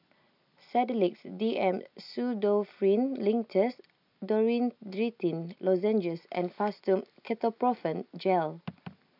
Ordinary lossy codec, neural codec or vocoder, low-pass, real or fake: none; none; 5.4 kHz; real